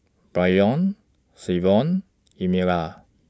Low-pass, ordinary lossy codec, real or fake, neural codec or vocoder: none; none; real; none